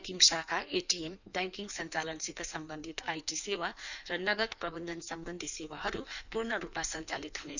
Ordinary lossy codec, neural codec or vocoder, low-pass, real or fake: none; codec, 16 kHz in and 24 kHz out, 1.1 kbps, FireRedTTS-2 codec; 7.2 kHz; fake